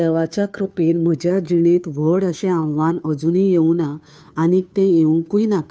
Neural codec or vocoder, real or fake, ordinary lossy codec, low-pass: codec, 16 kHz, 2 kbps, FunCodec, trained on Chinese and English, 25 frames a second; fake; none; none